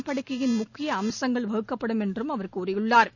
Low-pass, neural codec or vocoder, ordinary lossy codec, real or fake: 7.2 kHz; none; none; real